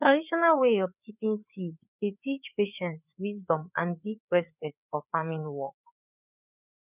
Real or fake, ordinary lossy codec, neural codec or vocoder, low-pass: fake; none; codec, 16 kHz, 4 kbps, FreqCodec, larger model; 3.6 kHz